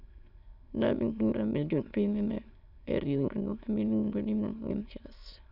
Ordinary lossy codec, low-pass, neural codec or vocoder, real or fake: none; 5.4 kHz; autoencoder, 22.05 kHz, a latent of 192 numbers a frame, VITS, trained on many speakers; fake